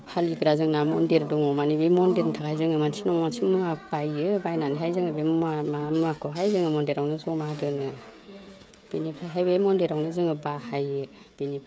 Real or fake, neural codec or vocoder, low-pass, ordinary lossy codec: fake; codec, 16 kHz, 16 kbps, FreqCodec, smaller model; none; none